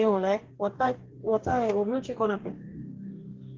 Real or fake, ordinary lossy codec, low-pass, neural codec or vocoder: fake; Opus, 16 kbps; 7.2 kHz; codec, 44.1 kHz, 2.6 kbps, DAC